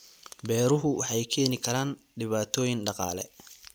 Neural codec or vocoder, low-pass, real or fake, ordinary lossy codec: vocoder, 44.1 kHz, 128 mel bands every 256 samples, BigVGAN v2; none; fake; none